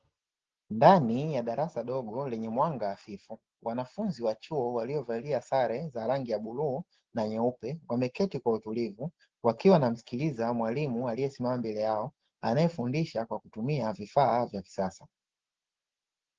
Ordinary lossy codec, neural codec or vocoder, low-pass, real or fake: Opus, 16 kbps; none; 7.2 kHz; real